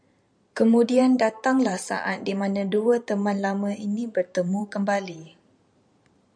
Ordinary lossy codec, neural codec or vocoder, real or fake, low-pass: MP3, 96 kbps; vocoder, 44.1 kHz, 128 mel bands every 512 samples, BigVGAN v2; fake; 9.9 kHz